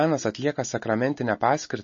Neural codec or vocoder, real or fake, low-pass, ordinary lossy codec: none; real; 7.2 kHz; MP3, 32 kbps